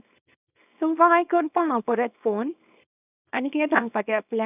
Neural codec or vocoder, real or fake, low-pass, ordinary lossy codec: codec, 24 kHz, 0.9 kbps, WavTokenizer, small release; fake; 3.6 kHz; none